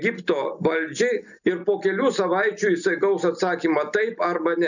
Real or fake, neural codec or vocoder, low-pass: real; none; 7.2 kHz